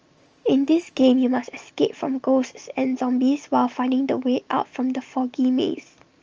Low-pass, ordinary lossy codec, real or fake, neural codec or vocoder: 7.2 kHz; Opus, 24 kbps; real; none